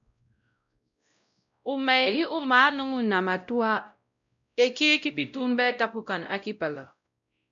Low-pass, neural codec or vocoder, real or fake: 7.2 kHz; codec, 16 kHz, 0.5 kbps, X-Codec, WavLM features, trained on Multilingual LibriSpeech; fake